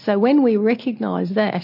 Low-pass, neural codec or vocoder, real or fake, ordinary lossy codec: 5.4 kHz; none; real; MP3, 32 kbps